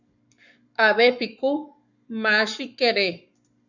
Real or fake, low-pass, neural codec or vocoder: fake; 7.2 kHz; codec, 44.1 kHz, 7.8 kbps, Pupu-Codec